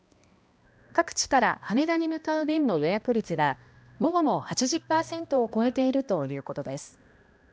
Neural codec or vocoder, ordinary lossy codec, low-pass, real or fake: codec, 16 kHz, 1 kbps, X-Codec, HuBERT features, trained on balanced general audio; none; none; fake